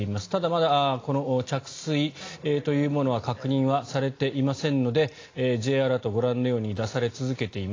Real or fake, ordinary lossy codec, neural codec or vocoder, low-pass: real; AAC, 32 kbps; none; 7.2 kHz